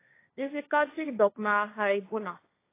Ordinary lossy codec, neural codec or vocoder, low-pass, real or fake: AAC, 24 kbps; codec, 16 kHz, 1.1 kbps, Voila-Tokenizer; 3.6 kHz; fake